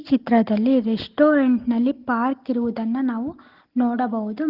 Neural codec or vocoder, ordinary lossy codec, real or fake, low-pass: none; Opus, 16 kbps; real; 5.4 kHz